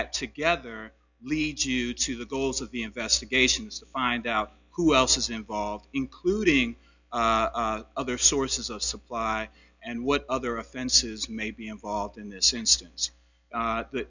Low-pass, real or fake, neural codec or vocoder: 7.2 kHz; real; none